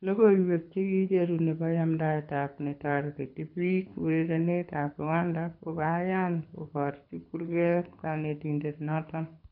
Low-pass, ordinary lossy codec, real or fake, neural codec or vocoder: 5.4 kHz; none; fake; codec, 24 kHz, 6 kbps, HILCodec